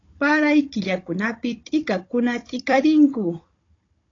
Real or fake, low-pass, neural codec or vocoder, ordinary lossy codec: fake; 7.2 kHz; codec, 16 kHz, 16 kbps, FunCodec, trained on Chinese and English, 50 frames a second; AAC, 32 kbps